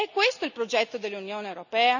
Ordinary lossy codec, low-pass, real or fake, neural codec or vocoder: none; 7.2 kHz; real; none